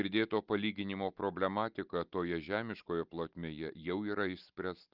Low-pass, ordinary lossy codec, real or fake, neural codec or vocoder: 5.4 kHz; Opus, 32 kbps; real; none